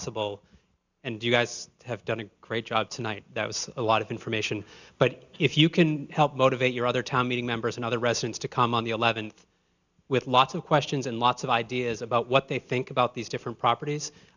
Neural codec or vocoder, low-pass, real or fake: none; 7.2 kHz; real